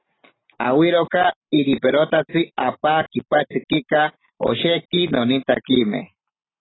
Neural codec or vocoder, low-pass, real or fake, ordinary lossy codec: none; 7.2 kHz; real; AAC, 16 kbps